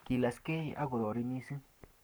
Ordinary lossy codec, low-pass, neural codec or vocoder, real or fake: none; none; codec, 44.1 kHz, 7.8 kbps, Pupu-Codec; fake